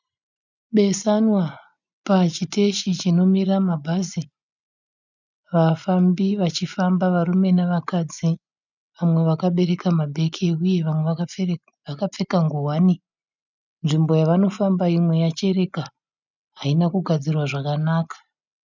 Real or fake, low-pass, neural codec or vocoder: real; 7.2 kHz; none